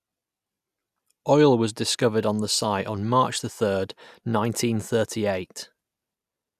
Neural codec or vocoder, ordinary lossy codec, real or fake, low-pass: none; none; real; 14.4 kHz